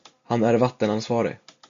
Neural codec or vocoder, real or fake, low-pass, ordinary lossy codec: none; real; 7.2 kHz; MP3, 64 kbps